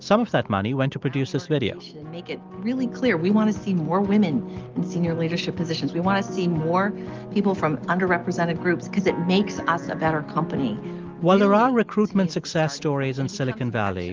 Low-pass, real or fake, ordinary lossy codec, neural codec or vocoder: 7.2 kHz; real; Opus, 32 kbps; none